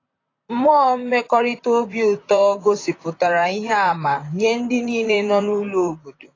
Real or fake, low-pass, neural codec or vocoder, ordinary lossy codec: fake; 7.2 kHz; vocoder, 44.1 kHz, 128 mel bands, Pupu-Vocoder; AAC, 32 kbps